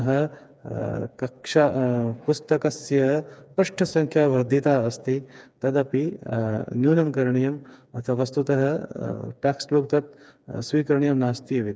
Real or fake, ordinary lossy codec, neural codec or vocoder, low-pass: fake; none; codec, 16 kHz, 4 kbps, FreqCodec, smaller model; none